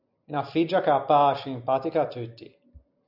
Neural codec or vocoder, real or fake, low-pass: none; real; 5.4 kHz